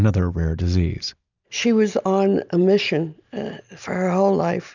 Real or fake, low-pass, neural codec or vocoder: real; 7.2 kHz; none